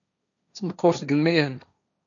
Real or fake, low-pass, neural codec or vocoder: fake; 7.2 kHz; codec, 16 kHz, 1.1 kbps, Voila-Tokenizer